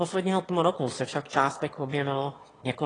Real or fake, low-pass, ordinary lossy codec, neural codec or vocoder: fake; 9.9 kHz; AAC, 32 kbps; autoencoder, 22.05 kHz, a latent of 192 numbers a frame, VITS, trained on one speaker